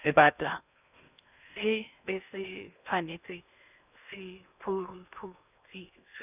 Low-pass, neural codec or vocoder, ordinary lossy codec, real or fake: 3.6 kHz; codec, 16 kHz in and 24 kHz out, 0.8 kbps, FocalCodec, streaming, 65536 codes; none; fake